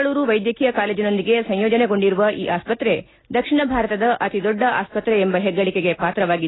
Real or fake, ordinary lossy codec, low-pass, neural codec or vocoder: real; AAC, 16 kbps; 7.2 kHz; none